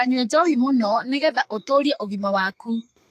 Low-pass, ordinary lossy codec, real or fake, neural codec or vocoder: 14.4 kHz; AAC, 64 kbps; fake; codec, 32 kHz, 1.9 kbps, SNAC